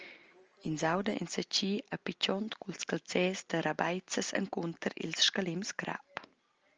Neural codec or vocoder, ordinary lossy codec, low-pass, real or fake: none; Opus, 24 kbps; 7.2 kHz; real